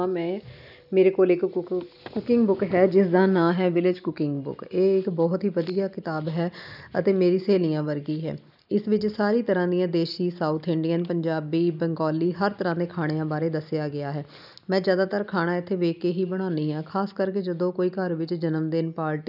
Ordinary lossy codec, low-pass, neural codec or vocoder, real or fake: none; 5.4 kHz; none; real